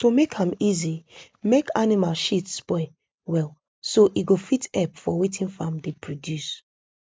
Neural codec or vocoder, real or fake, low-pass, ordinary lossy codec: none; real; none; none